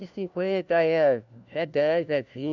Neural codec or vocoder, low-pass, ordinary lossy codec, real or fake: codec, 16 kHz, 1 kbps, FunCodec, trained on LibriTTS, 50 frames a second; 7.2 kHz; none; fake